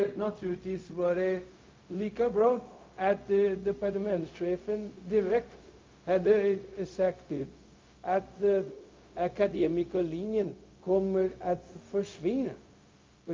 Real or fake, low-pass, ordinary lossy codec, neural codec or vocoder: fake; 7.2 kHz; Opus, 24 kbps; codec, 16 kHz, 0.4 kbps, LongCat-Audio-Codec